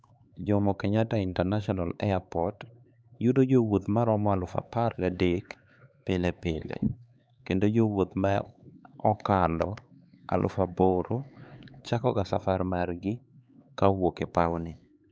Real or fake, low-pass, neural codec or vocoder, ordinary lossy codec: fake; none; codec, 16 kHz, 4 kbps, X-Codec, HuBERT features, trained on LibriSpeech; none